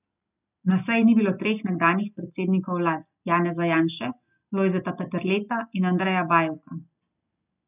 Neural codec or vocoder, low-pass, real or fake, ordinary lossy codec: none; 3.6 kHz; real; none